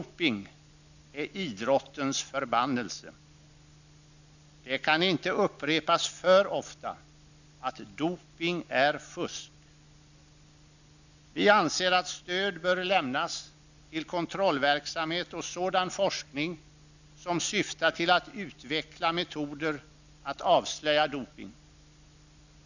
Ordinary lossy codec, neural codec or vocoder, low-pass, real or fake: none; none; 7.2 kHz; real